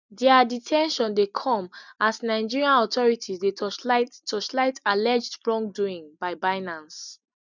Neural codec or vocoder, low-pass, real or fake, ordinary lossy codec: none; 7.2 kHz; real; none